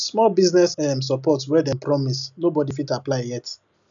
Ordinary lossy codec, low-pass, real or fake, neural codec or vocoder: none; 7.2 kHz; real; none